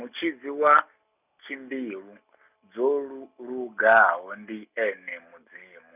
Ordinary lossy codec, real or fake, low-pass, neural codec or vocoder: none; real; 3.6 kHz; none